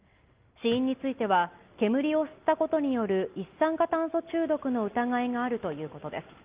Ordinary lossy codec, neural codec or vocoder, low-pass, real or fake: Opus, 32 kbps; none; 3.6 kHz; real